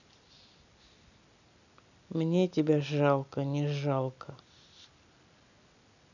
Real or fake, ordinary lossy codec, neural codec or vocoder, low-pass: real; none; none; 7.2 kHz